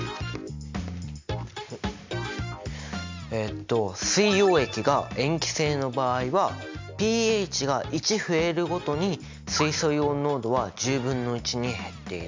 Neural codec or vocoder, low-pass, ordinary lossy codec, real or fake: none; 7.2 kHz; MP3, 64 kbps; real